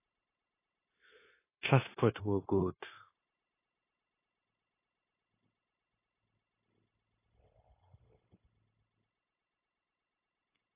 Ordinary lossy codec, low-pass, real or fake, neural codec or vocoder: AAC, 16 kbps; 3.6 kHz; fake; codec, 16 kHz, 0.9 kbps, LongCat-Audio-Codec